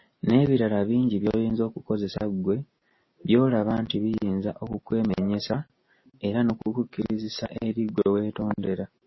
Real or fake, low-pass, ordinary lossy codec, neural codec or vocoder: real; 7.2 kHz; MP3, 24 kbps; none